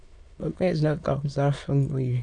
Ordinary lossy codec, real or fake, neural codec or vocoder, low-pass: MP3, 96 kbps; fake; autoencoder, 22.05 kHz, a latent of 192 numbers a frame, VITS, trained on many speakers; 9.9 kHz